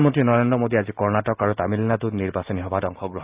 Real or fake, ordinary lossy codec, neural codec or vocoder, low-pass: real; Opus, 32 kbps; none; 3.6 kHz